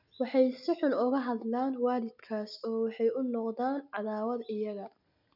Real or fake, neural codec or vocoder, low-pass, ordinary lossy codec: real; none; 5.4 kHz; none